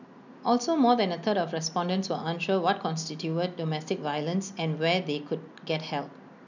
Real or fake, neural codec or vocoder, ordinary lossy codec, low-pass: real; none; none; 7.2 kHz